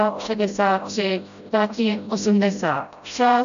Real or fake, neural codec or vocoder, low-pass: fake; codec, 16 kHz, 0.5 kbps, FreqCodec, smaller model; 7.2 kHz